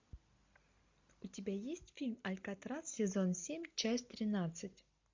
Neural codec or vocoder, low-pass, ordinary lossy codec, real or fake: none; 7.2 kHz; MP3, 64 kbps; real